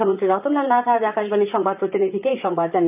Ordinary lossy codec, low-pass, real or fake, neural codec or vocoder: none; 3.6 kHz; fake; vocoder, 44.1 kHz, 128 mel bands, Pupu-Vocoder